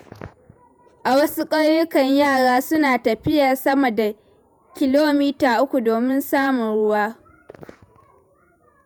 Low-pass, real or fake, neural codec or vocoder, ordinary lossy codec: none; fake; vocoder, 48 kHz, 128 mel bands, Vocos; none